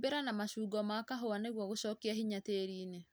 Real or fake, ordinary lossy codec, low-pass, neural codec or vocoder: real; none; none; none